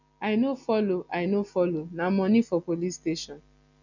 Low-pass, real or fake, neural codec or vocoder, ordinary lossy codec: 7.2 kHz; real; none; none